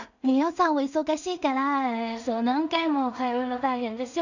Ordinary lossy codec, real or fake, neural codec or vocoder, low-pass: none; fake; codec, 16 kHz in and 24 kHz out, 0.4 kbps, LongCat-Audio-Codec, two codebook decoder; 7.2 kHz